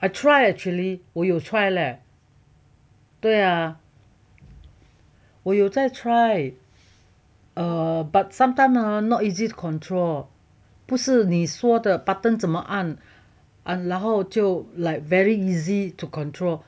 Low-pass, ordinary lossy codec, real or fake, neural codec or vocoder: none; none; real; none